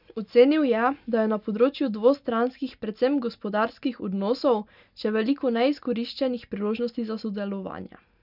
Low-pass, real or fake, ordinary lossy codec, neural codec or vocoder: 5.4 kHz; real; none; none